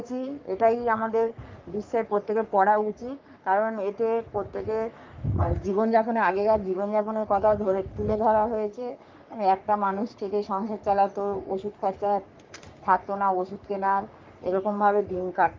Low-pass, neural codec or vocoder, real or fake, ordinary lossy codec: 7.2 kHz; codec, 44.1 kHz, 3.4 kbps, Pupu-Codec; fake; Opus, 24 kbps